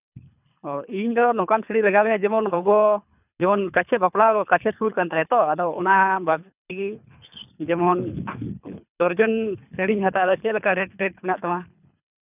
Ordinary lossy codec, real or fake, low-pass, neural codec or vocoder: none; fake; 3.6 kHz; codec, 24 kHz, 3 kbps, HILCodec